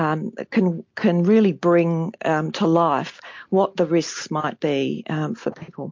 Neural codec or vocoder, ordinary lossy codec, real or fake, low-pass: none; MP3, 48 kbps; real; 7.2 kHz